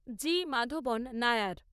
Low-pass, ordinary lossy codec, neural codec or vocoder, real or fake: 14.4 kHz; none; vocoder, 44.1 kHz, 128 mel bands, Pupu-Vocoder; fake